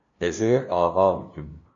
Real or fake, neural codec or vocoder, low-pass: fake; codec, 16 kHz, 0.5 kbps, FunCodec, trained on LibriTTS, 25 frames a second; 7.2 kHz